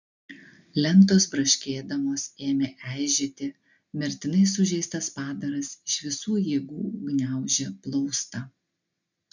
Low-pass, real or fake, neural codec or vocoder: 7.2 kHz; real; none